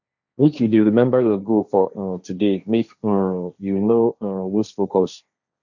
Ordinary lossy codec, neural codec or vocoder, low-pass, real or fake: none; codec, 16 kHz, 1.1 kbps, Voila-Tokenizer; 7.2 kHz; fake